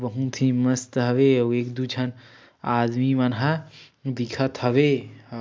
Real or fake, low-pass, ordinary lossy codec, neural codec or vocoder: real; none; none; none